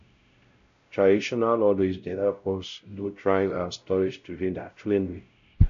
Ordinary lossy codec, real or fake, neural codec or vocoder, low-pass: MP3, 64 kbps; fake; codec, 16 kHz, 0.5 kbps, X-Codec, WavLM features, trained on Multilingual LibriSpeech; 7.2 kHz